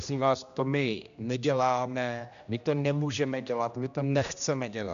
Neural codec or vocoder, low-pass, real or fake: codec, 16 kHz, 1 kbps, X-Codec, HuBERT features, trained on general audio; 7.2 kHz; fake